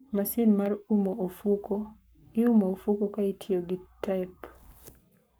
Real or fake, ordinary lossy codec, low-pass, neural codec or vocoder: fake; none; none; codec, 44.1 kHz, 7.8 kbps, Pupu-Codec